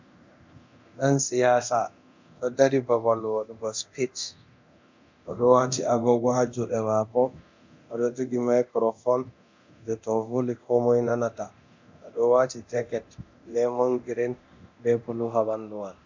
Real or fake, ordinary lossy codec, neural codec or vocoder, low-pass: fake; MP3, 64 kbps; codec, 24 kHz, 0.9 kbps, DualCodec; 7.2 kHz